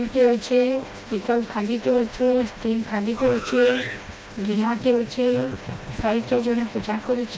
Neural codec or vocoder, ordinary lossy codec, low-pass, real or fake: codec, 16 kHz, 1 kbps, FreqCodec, smaller model; none; none; fake